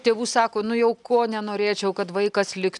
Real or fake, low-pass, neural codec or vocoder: real; 10.8 kHz; none